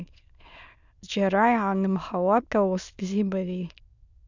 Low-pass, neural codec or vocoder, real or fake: 7.2 kHz; autoencoder, 22.05 kHz, a latent of 192 numbers a frame, VITS, trained on many speakers; fake